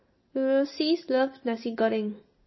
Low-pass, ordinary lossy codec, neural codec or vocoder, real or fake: 7.2 kHz; MP3, 24 kbps; none; real